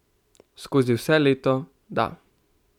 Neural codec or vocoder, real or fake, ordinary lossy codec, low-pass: vocoder, 44.1 kHz, 128 mel bands every 256 samples, BigVGAN v2; fake; none; 19.8 kHz